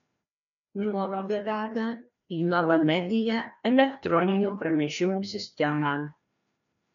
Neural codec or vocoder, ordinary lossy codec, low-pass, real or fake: codec, 16 kHz, 1 kbps, FreqCodec, larger model; MP3, 96 kbps; 7.2 kHz; fake